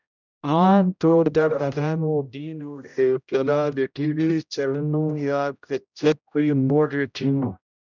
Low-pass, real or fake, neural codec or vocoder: 7.2 kHz; fake; codec, 16 kHz, 0.5 kbps, X-Codec, HuBERT features, trained on general audio